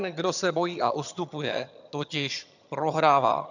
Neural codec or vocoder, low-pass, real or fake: vocoder, 22.05 kHz, 80 mel bands, HiFi-GAN; 7.2 kHz; fake